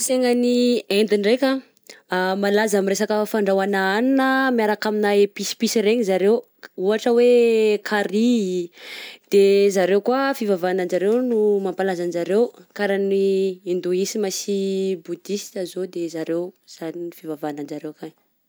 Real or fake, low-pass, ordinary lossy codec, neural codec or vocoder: real; none; none; none